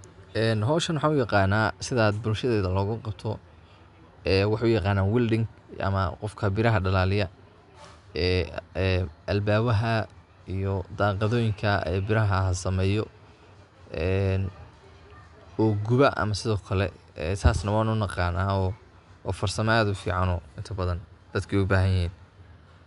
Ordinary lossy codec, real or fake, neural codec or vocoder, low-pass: MP3, 96 kbps; real; none; 10.8 kHz